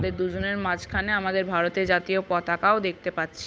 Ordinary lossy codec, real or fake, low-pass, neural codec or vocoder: none; fake; none; codec, 16 kHz, 8 kbps, FunCodec, trained on Chinese and English, 25 frames a second